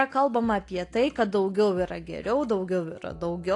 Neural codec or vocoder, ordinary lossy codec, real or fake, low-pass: none; AAC, 48 kbps; real; 10.8 kHz